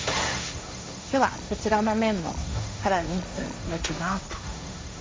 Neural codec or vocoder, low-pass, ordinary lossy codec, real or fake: codec, 16 kHz, 1.1 kbps, Voila-Tokenizer; none; none; fake